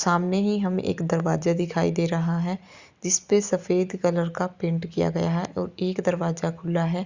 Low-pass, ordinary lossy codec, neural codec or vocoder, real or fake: 7.2 kHz; Opus, 64 kbps; none; real